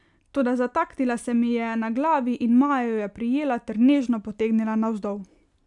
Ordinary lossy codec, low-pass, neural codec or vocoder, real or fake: none; 10.8 kHz; none; real